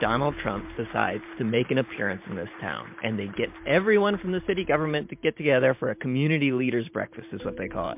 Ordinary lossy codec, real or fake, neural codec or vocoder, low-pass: MP3, 32 kbps; fake; vocoder, 44.1 kHz, 128 mel bands every 512 samples, BigVGAN v2; 3.6 kHz